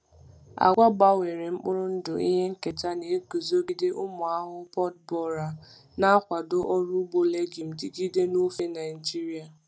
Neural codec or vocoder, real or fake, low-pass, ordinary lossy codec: none; real; none; none